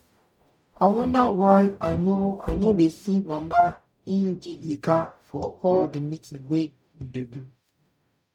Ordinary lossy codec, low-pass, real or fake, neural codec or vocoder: MP3, 96 kbps; 19.8 kHz; fake; codec, 44.1 kHz, 0.9 kbps, DAC